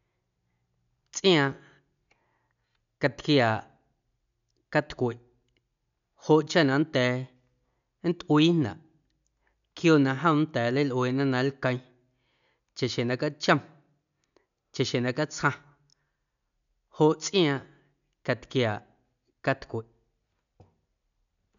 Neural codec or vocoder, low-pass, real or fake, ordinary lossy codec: none; 7.2 kHz; real; none